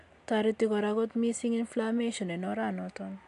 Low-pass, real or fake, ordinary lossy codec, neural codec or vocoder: 10.8 kHz; real; AAC, 64 kbps; none